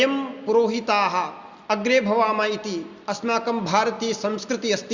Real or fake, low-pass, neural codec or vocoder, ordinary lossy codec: real; 7.2 kHz; none; Opus, 64 kbps